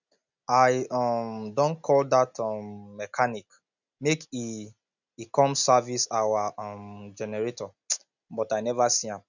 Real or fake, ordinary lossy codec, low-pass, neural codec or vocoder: real; none; 7.2 kHz; none